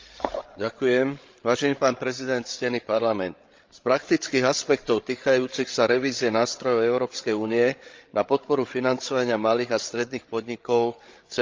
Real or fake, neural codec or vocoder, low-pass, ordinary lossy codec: fake; codec, 16 kHz, 16 kbps, FreqCodec, larger model; 7.2 kHz; Opus, 24 kbps